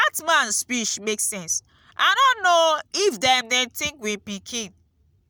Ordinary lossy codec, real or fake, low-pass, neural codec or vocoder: none; real; none; none